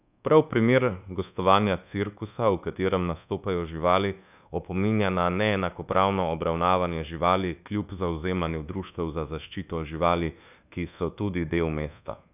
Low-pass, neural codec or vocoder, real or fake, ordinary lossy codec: 3.6 kHz; codec, 24 kHz, 1.2 kbps, DualCodec; fake; none